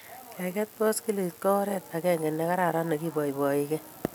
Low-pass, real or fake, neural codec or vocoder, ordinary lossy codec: none; real; none; none